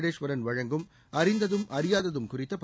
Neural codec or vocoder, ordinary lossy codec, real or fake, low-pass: none; none; real; none